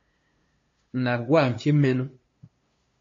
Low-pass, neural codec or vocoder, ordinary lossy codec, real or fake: 7.2 kHz; codec, 16 kHz, 2 kbps, FunCodec, trained on LibriTTS, 25 frames a second; MP3, 32 kbps; fake